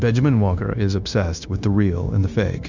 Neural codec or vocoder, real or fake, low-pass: codec, 16 kHz, 0.9 kbps, LongCat-Audio-Codec; fake; 7.2 kHz